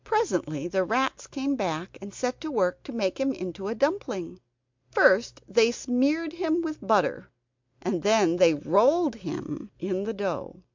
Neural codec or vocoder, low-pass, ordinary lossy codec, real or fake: none; 7.2 kHz; MP3, 64 kbps; real